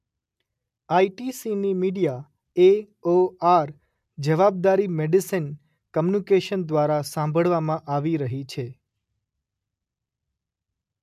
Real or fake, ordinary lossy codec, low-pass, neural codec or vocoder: real; MP3, 96 kbps; 14.4 kHz; none